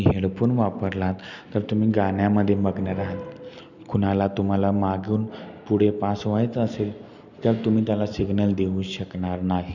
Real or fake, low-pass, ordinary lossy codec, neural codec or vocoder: real; 7.2 kHz; none; none